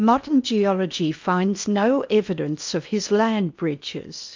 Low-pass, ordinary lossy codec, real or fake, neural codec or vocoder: 7.2 kHz; MP3, 64 kbps; fake; codec, 16 kHz in and 24 kHz out, 0.8 kbps, FocalCodec, streaming, 65536 codes